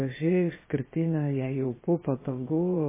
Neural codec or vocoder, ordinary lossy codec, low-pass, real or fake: codec, 16 kHz, 0.7 kbps, FocalCodec; MP3, 16 kbps; 3.6 kHz; fake